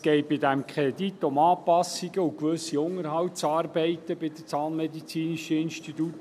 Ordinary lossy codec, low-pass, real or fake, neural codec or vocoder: none; 14.4 kHz; real; none